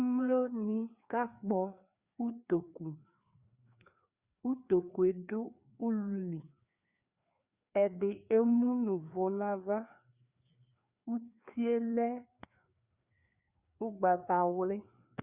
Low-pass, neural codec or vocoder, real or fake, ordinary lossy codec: 3.6 kHz; codec, 16 kHz, 2 kbps, FreqCodec, larger model; fake; Opus, 64 kbps